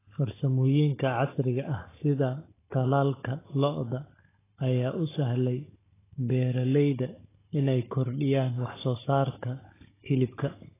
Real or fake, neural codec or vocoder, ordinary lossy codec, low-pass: fake; codec, 16 kHz, 16 kbps, FunCodec, trained on Chinese and English, 50 frames a second; AAC, 16 kbps; 3.6 kHz